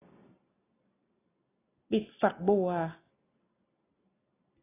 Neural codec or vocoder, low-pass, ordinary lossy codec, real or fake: none; 3.6 kHz; AAC, 16 kbps; real